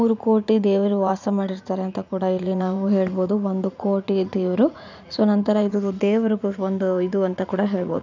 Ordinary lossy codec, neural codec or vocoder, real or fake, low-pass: none; vocoder, 44.1 kHz, 80 mel bands, Vocos; fake; 7.2 kHz